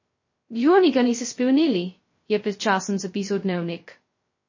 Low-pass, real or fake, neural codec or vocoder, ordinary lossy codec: 7.2 kHz; fake; codec, 16 kHz, 0.2 kbps, FocalCodec; MP3, 32 kbps